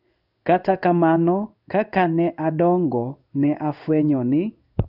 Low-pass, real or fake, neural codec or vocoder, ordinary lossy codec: 5.4 kHz; fake; codec, 16 kHz in and 24 kHz out, 1 kbps, XY-Tokenizer; none